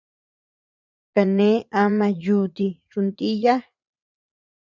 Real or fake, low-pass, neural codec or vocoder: fake; 7.2 kHz; vocoder, 44.1 kHz, 80 mel bands, Vocos